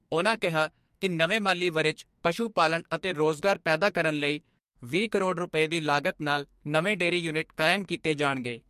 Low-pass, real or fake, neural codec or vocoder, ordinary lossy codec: 14.4 kHz; fake; codec, 44.1 kHz, 2.6 kbps, SNAC; MP3, 64 kbps